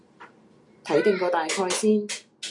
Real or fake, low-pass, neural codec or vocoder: real; 10.8 kHz; none